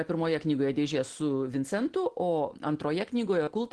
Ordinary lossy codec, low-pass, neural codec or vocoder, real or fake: Opus, 16 kbps; 10.8 kHz; none; real